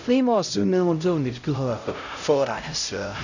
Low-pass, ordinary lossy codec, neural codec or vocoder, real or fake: 7.2 kHz; none; codec, 16 kHz, 0.5 kbps, X-Codec, HuBERT features, trained on LibriSpeech; fake